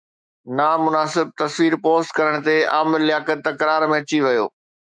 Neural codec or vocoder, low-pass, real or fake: autoencoder, 48 kHz, 128 numbers a frame, DAC-VAE, trained on Japanese speech; 9.9 kHz; fake